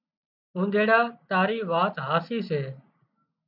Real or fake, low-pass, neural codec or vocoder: real; 5.4 kHz; none